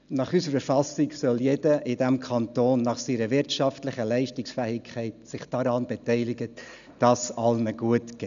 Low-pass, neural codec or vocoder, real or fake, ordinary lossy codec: 7.2 kHz; none; real; none